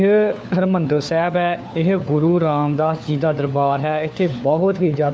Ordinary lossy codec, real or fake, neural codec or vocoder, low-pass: none; fake; codec, 16 kHz, 4 kbps, FunCodec, trained on LibriTTS, 50 frames a second; none